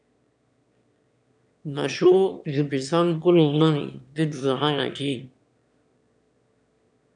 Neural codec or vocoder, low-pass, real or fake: autoencoder, 22.05 kHz, a latent of 192 numbers a frame, VITS, trained on one speaker; 9.9 kHz; fake